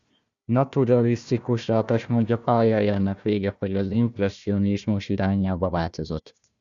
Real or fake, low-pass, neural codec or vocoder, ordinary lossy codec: fake; 7.2 kHz; codec, 16 kHz, 1 kbps, FunCodec, trained on Chinese and English, 50 frames a second; Opus, 64 kbps